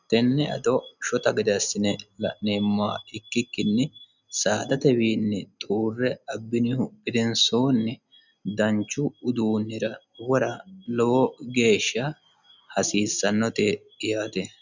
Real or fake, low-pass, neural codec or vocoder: real; 7.2 kHz; none